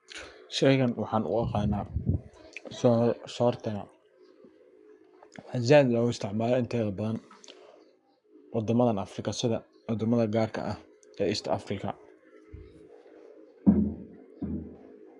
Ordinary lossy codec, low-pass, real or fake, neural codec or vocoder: MP3, 96 kbps; 10.8 kHz; fake; codec, 44.1 kHz, 7.8 kbps, Pupu-Codec